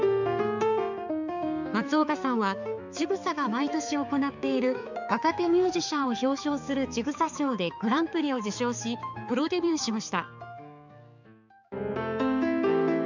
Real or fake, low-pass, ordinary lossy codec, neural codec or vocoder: fake; 7.2 kHz; none; codec, 16 kHz, 4 kbps, X-Codec, HuBERT features, trained on balanced general audio